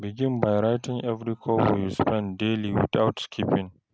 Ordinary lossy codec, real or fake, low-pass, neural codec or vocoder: none; real; none; none